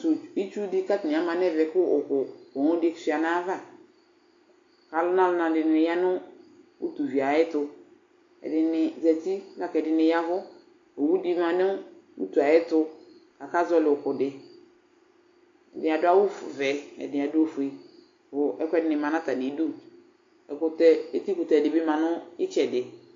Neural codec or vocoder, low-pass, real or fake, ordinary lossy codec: none; 7.2 kHz; real; AAC, 48 kbps